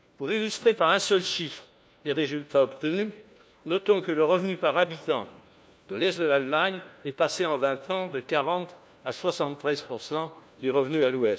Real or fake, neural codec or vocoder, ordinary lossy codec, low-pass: fake; codec, 16 kHz, 1 kbps, FunCodec, trained on LibriTTS, 50 frames a second; none; none